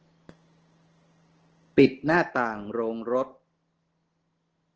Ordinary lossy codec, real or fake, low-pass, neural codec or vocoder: Opus, 16 kbps; real; 7.2 kHz; none